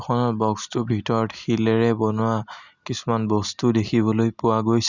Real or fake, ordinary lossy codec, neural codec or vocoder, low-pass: real; none; none; 7.2 kHz